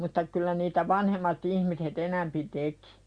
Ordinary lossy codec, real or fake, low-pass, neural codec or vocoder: Opus, 64 kbps; real; 9.9 kHz; none